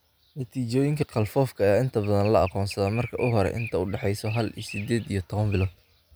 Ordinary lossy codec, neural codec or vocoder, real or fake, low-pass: none; none; real; none